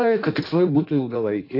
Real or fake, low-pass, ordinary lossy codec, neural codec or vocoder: fake; 5.4 kHz; AAC, 32 kbps; codec, 16 kHz in and 24 kHz out, 1.1 kbps, FireRedTTS-2 codec